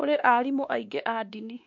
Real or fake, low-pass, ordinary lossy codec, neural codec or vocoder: fake; 7.2 kHz; MP3, 48 kbps; codec, 16 kHz, 2 kbps, X-Codec, WavLM features, trained on Multilingual LibriSpeech